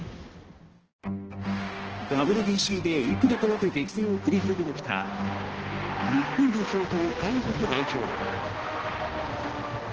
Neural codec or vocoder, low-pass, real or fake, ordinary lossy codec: codec, 16 kHz, 1 kbps, X-Codec, HuBERT features, trained on balanced general audio; 7.2 kHz; fake; Opus, 16 kbps